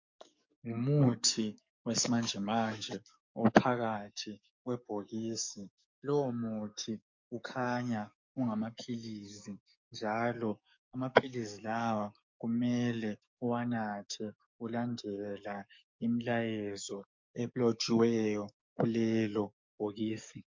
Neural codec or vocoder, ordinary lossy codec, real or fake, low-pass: codec, 44.1 kHz, 7.8 kbps, DAC; MP3, 48 kbps; fake; 7.2 kHz